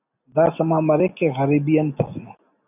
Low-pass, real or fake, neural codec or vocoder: 3.6 kHz; real; none